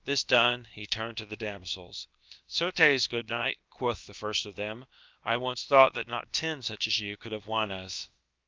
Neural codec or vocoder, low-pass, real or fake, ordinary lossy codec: codec, 16 kHz, about 1 kbps, DyCAST, with the encoder's durations; 7.2 kHz; fake; Opus, 32 kbps